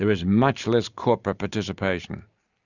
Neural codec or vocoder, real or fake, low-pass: none; real; 7.2 kHz